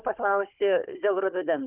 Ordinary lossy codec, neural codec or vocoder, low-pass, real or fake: Opus, 24 kbps; codec, 16 kHz, 16 kbps, FunCodec, trained on Chinese and English, 50 frames a second; 3.6 kHz; fake